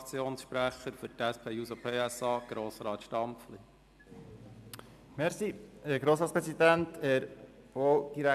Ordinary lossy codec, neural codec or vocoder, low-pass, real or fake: AAC, 96 kbps; none; 14.4 kHz; real